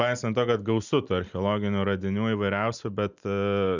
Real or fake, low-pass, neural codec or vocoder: real; 7.2 kHz; none